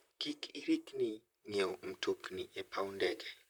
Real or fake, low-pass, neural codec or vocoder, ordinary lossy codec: fake; none; vocoder, 44.1 kHz, 128 mel bands, Pupu-Vocoder; none